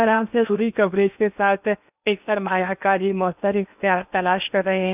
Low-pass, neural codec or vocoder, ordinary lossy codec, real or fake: 3.6 kHz; codec, 16 kHz in and 24 kHz out, 0.8 kbps, FocalCodec, streaming, 65536 codes; none; fake